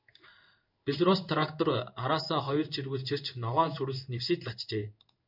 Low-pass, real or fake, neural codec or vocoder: 5.4 kHz; fake; codec, 16 kHz in and 24 kHz out, 1 kbps, XY-Tokenizer